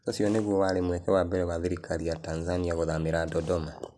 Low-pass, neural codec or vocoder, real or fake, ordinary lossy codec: none; none; real; none